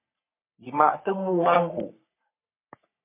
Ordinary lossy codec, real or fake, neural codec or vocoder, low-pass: MP3, 24 kbps; fake; codec, 44.1 kHz, 3.4 kbps, Pupu-Codec; 3.6 kHz